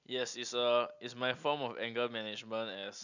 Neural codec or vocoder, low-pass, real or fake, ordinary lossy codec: none; 7.2 kHz; real; none